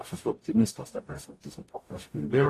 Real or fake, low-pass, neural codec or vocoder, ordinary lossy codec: fake; 14.4 kHz; codec, 44.1 kHz, 0.9 kbps, DAC; AAC, 48 kbps